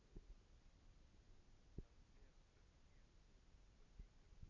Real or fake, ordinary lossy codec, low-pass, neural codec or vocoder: real; none; 7.2 kHz; none